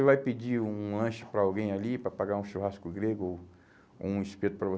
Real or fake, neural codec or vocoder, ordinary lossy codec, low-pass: real; none; none; none